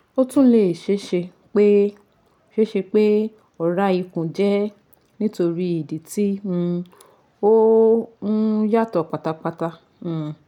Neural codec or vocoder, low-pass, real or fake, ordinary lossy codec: none; 19.8 kHz; real; none